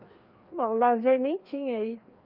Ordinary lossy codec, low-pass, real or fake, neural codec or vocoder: Opus, 24 kbps; 5.4 kHz; fake; codec, 16 kHz, 2 kbps, FreqCodec, larger model